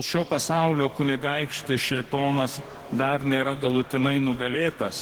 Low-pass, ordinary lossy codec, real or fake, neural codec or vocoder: 19.8 kHz; Opus, 16 kbps; fake; codec, 44.1 kHz, 2.6 kbps, DAC